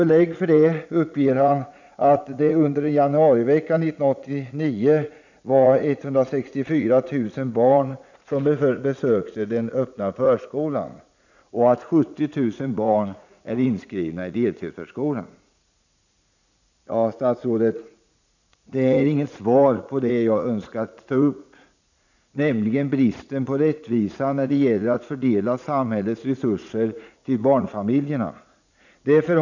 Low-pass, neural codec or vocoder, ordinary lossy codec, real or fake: 7.2 kHz; vocoder, 44.1 kHz, 80 mel bands, Vocos; none; fake